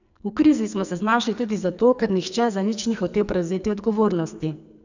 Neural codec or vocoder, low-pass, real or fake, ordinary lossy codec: codec, 44.1 kHz, 2.6 kbps, SNAC; 7.2 kHz; fake; none